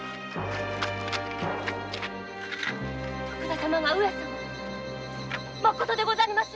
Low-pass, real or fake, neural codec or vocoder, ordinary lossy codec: none; real; none; none